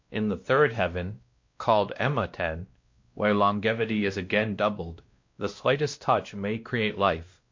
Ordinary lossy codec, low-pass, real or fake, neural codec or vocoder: MP3, 48 kbps; 7.2 kHz; fake; codec, 16 kHz, 1 kbps, X-Codec, WavLM features, trained on Multilingual LibriSpeech